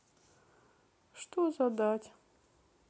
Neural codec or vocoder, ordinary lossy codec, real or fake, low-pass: none; none; real; none